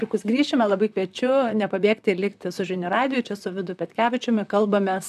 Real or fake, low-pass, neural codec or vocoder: fake; 14.4 kHz; vocoder, 44.1 kHz, 128 mel bands, Pupu-Vocoder